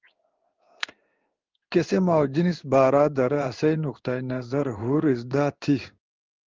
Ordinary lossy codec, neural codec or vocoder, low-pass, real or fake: Opus, 24 kbps; codec, 16 kHz in and 24 kHz out, 1 kbps, XY-Tokenizer; 7.2 kHz; fake